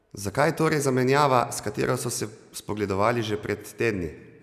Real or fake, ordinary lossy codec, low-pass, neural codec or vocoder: real; none; 14.4 kHz; none